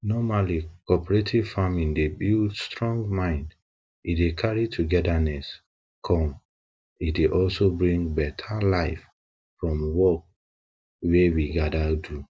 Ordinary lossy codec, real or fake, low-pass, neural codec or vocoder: none; real; none; none